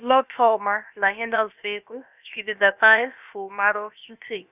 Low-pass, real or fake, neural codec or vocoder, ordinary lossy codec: 3.6 kHz; fake; codec, 16 kHz, about 1 kbps, DyCAST, with the encoder's durations; none